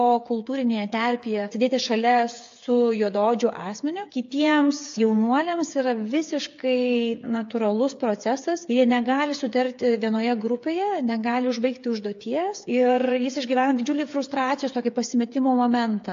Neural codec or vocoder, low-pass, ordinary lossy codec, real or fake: codec, 16 kHz, 8 kbps, FreqCodec, smaller model; 7.2 kHz; AAC, 48 kbps; fake